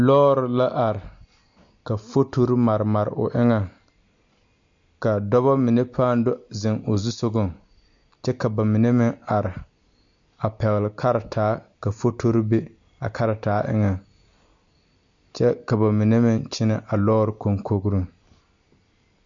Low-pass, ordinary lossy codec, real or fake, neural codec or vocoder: 7.2 kHz; MP3, 64 kbps; real; none